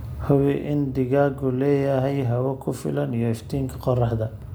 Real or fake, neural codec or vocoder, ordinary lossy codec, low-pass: real; none; none; none